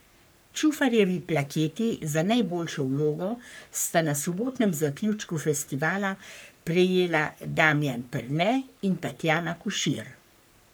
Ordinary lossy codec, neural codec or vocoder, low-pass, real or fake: none; codec, 44.1 kHz, 3.4 kbps, Pupu-Codec; none; fake